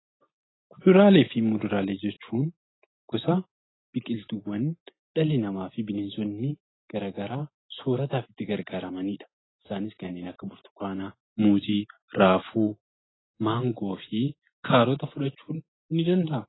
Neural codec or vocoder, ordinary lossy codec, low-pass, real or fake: none; AAC, 16 kbps; 7.2 kHz; real